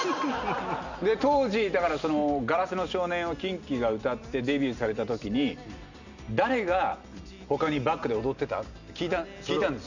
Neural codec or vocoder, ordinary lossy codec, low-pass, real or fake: none; none; 7.2 kHz; real